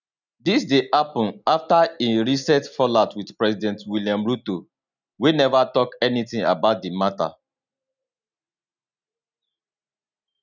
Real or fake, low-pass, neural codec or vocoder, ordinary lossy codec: real; 7.2 kHz; none; none